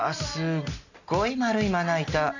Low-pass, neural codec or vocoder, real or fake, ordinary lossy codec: 7.2 kHz; none; real; none